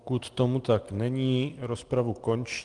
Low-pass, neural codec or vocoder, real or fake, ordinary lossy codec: 10.8 kHz; none; real; Opus, 24 kbps